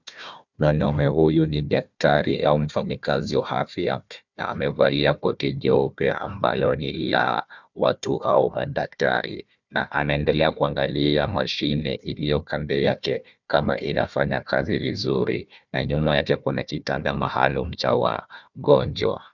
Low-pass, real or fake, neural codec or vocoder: 7.2 kHz; fake; codec, 16 kHz, 1 kbps, FunCodec, trained on Chinese and English, 50 frames a second